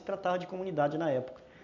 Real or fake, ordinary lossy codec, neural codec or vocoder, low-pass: real; none; none; 7.2 kHz